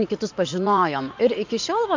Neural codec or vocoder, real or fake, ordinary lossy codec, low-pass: vocoder, 44.1 kHz, 80 mel bands, Vocos; fake; MP3, 64 kbps; 7.2 kHz